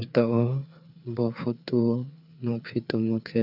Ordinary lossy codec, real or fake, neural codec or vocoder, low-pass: none; fake; codec, 16 kHz, 4 kbps, FreqCodec, larger model; 5.4 kHz